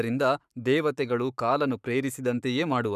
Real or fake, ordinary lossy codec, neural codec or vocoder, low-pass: fake; none; codec, 44.1 kHz, 7.8 kbps, Pupu-Codec; 14.4 kHz